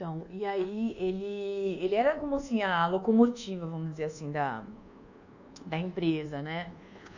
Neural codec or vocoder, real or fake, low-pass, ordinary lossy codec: codec, 24 kHz, 1.2 kbps, DualCodec; fake; 7.2 kHz; none